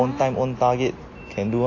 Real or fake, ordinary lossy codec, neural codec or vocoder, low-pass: real; AAC, 32 kbps; none; 7.2 kHz